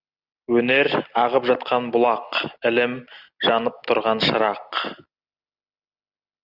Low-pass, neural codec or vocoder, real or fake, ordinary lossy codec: 5.4 kHz; none; real; Opus, 64 kbps